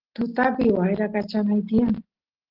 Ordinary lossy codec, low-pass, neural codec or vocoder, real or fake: Opus, 16 kbps; 5.4 kHz; none; real